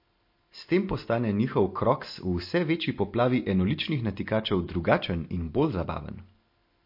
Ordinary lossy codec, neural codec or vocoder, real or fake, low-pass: MP3, 32 kbps; none; real; 5.4 kHz